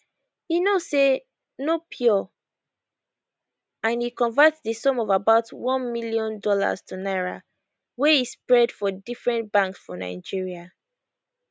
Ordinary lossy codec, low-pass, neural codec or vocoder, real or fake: none; none; none; real